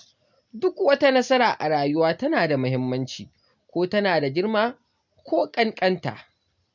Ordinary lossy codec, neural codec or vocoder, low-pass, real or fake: none; none; 7.2 kHz; real